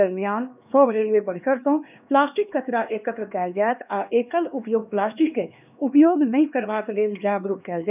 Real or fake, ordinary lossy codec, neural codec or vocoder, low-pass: fake; none; codec, 16 kHz, 2 kbps, X-Codec, HuBERT features, trained on LibriSpeech; 3.6 kHz